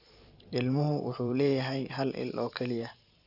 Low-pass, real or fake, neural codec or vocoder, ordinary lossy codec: 5.4 kHz; fake; vocoder, 44.1 kHz, 128 mel bands every 512 samples, BigVGAN v2; none